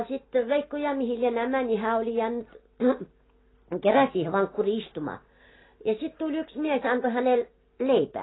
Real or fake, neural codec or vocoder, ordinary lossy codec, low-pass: real; none; AAC, 16 kbps; 7.2 kHz